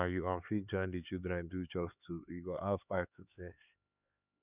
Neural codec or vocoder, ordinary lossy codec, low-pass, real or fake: codec, 16 kHz, 4 kbps, X-Codec, HuBERT features, trained on LibriSpeech; none; 3.6 kHz; fake